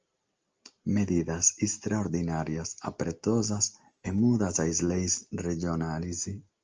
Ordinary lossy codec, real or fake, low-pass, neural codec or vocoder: Opus, 24 kbps; real; 7.2 kHz; none